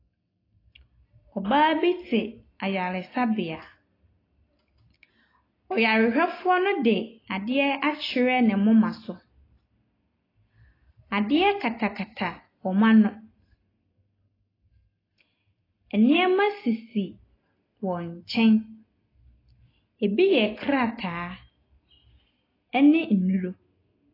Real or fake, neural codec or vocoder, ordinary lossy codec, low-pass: real; none; AAC, 24 kbps; 5.4 kHz